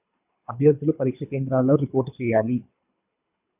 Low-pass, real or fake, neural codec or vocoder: 3.6 kHz; fake; codec, 24 kHz, 3 kbps, HILCodec